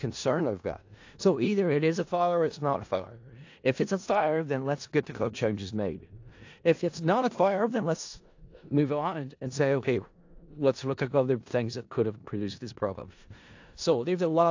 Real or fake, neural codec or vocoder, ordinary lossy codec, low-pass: fake; codec, 16 kHz in and 24 kHz out, 0.4 kbps, LongCat-Audio-Codec, four codebook decoder; AAC, 48 kbps; 7.2 kHz